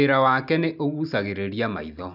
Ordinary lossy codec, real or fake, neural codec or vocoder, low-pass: none; real; none; 5.4 kHz